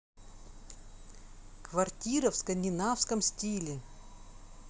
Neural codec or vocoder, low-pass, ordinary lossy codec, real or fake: none; none; none; real